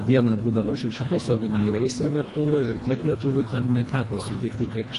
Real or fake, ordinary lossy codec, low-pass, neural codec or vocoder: fake; AAC, 48 kbps; 10.8 kHz; codec, 24 kHz, 1.5 kbps, HILCodec